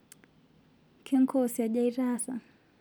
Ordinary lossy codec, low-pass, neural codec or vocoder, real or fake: none; none; none; real